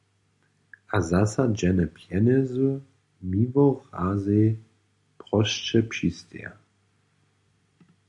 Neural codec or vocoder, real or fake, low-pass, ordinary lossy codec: none; real; 10.8 kHz; MP3, 96 kbps